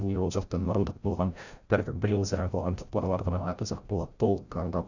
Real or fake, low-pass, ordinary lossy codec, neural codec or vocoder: fake; 7.2 kHz; none; codec, 16 kHz, 0.5 kbps, FreqCodec, larger model